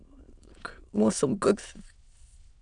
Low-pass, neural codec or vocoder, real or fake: 9.9 kHz; autoencoder, 22.05 kHz, a latent of 192 numbers a frame, VITS, trained on many speakers; fake